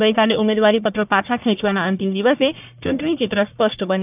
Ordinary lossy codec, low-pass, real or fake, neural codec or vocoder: AAC, 32 kbps; 3.6 kHz; fake; codec, 44.1 kHz, 1.7 kbps, Pupu-Codec